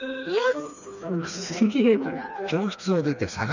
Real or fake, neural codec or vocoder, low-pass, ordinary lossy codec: fake; codec, 16 kHz, 2 kbps, FreqCodec, smaller model; 7.2 kHz; none